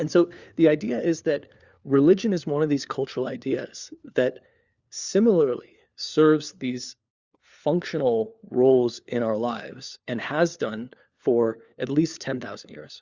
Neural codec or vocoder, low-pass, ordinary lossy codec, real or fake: codec, 16 kHz, 4 kbps, FunCodec, trained on LibriTTS, 50 frames a second; 7.2 kHz; Opus, 64 kbps; fake